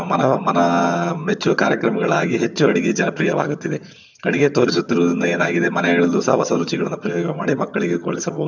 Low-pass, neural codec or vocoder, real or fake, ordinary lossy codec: 7.2 kHz; vocoder, 22.05 kHz, 80 mel bands, HiFi-GAN; fake; none